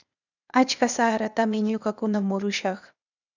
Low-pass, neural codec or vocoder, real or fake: 7.2 kHz; codec, 16 kHz, 0.8 kbps, ZipCodec; fake